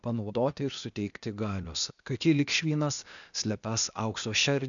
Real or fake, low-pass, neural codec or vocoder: fake; 7.2 kHz; codec, 16 kHz, 0.8 kbps, ZipCodec